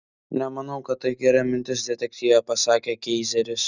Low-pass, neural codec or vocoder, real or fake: 7.2 kHz; none; real